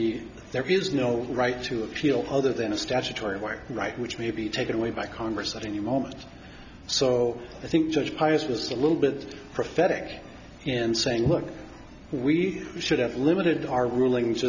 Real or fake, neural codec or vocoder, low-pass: real; none; 7.2 kHz